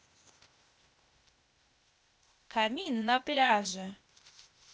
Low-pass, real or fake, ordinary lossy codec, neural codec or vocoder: none; fake; none; codec, 16 kHz, 0.8 kbps, ZipCodec